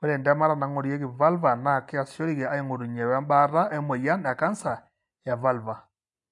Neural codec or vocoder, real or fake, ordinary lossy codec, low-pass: none; real; AAC, 64 kbps; 10.8 kHz